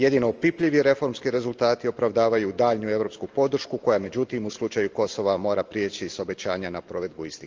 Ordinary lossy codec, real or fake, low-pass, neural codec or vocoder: Opus, 24 kbps; real; 7.2 kHz; none